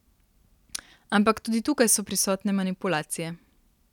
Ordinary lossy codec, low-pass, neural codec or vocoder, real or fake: none; 19.8 kHz; none; real